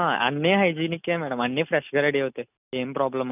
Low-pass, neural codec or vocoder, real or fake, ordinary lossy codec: 3.6 kHz; none; real; none